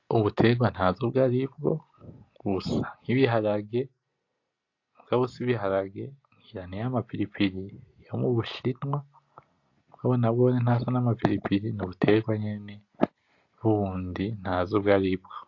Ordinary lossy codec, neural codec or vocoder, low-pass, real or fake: AAC, 48 kbps; none; 7.2 kHz; real